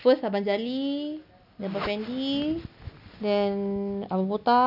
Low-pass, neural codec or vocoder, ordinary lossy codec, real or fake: 5.4 kHz; none; none; real